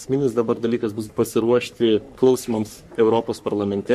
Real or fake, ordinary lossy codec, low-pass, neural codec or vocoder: fake; MP3, 64 kbps; 14.4 kHz; codec, 44.1 kHz, 3.4 kbps, Pupu-Codec